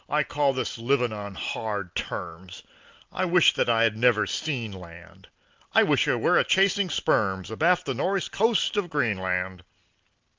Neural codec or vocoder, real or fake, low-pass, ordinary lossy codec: none; real; 7.2 kHz; Opus, 32 kbps